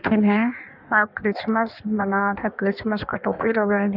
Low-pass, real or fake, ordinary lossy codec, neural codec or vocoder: 5.4 kHz; fake; none; codec, 16 kHz in and 24 kHz out, 1.1 kbps, FireRedTTS-2 codec